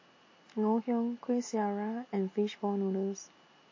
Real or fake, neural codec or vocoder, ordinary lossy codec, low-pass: real; none; MP3, 32 kbps; 7.2 kHz